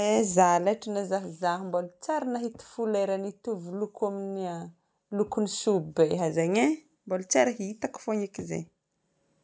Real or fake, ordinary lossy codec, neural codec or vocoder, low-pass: real; none; none; none